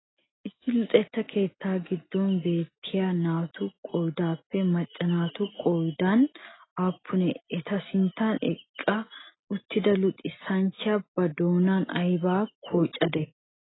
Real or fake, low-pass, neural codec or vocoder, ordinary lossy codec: real; 7.2 kHz; none; AAC, 16 kbps